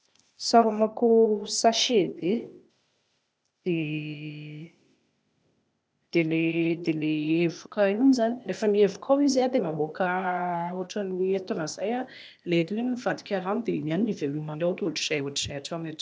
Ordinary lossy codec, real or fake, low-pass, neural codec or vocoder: none; fake; none; codec, 16 kHz, 0.8 kbps, ZipCodec